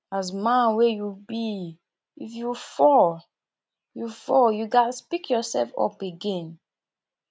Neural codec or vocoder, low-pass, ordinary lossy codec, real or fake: none; none; none; real